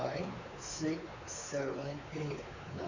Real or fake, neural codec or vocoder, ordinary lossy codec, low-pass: fake; codec, 16 kHz, 4 kbps, X-Codec, WavLM features, trained on Multilingual LibriSpeech; none; 7.2 kHz